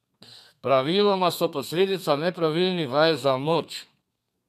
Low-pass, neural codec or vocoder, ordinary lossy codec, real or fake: 14.4 kHz; codec, 32 kHz, 1.9 kbps, SNAC; none; fake